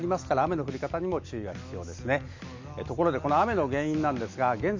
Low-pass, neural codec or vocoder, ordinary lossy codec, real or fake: 7.2 kHz; none; MP3, 64 kbps; real